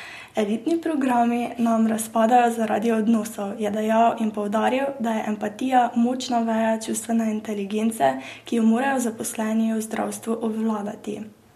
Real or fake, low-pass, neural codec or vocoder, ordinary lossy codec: real; 14.4 kHz; none; MP3, 64 kbps